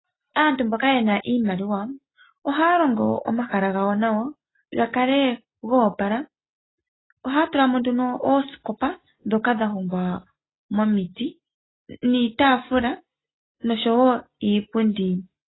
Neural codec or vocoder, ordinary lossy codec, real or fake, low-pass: none; AAC, 16 kbps; real; 7.2 kHz